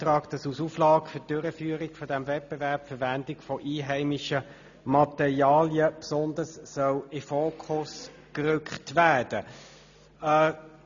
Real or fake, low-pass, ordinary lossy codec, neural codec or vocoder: real; 7.2 kHz; none; none